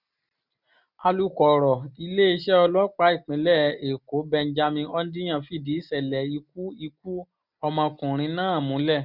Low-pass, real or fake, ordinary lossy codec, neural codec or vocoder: 5.4 kHz; real; none; none